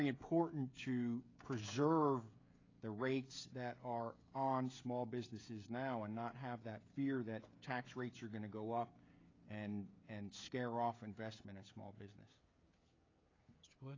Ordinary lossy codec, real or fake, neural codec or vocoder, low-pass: AAC, 32 kbps; fake; codec, 16 kHz, 16 kbps, FreqCodec, smaller model; 7.2 kHz